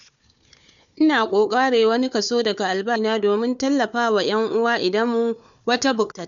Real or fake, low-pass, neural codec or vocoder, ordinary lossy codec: fake; 7.2 kHz; codec, 16 kHz, 4 kbps, FunCodec, trained on Chinese and English, 50 frames a second; none